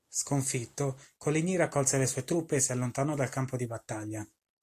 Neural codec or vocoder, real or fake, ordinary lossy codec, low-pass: none; real; AAC, 64 kbps; 14.4 kHz